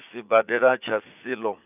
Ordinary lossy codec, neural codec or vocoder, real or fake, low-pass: none; vocoder, 44.1 kHz, 80 mel bands, Vocos; fake; 3.6 kHz